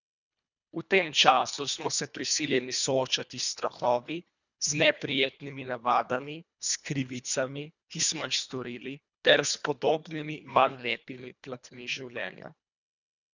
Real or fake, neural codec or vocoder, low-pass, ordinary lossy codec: fake; codec, 24 kHz, 1.5 kbps, HILCodec; 7.2 kHz; none